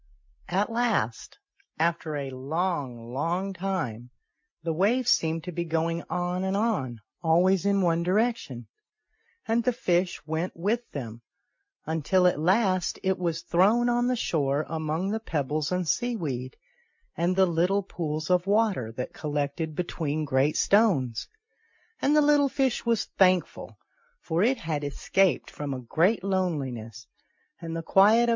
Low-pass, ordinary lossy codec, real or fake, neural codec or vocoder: 7.2 kHz; MP3, 48 kbps; real; none